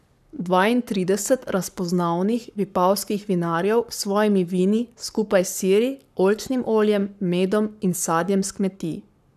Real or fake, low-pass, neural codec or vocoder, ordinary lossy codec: fake; 14.4 kHz; codec, 44.1 kHz, 7.8 kbps, Pupu-Codec; none